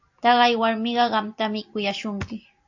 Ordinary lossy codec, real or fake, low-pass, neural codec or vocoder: AAC, 48 kbps; real; 7.2 kHz; none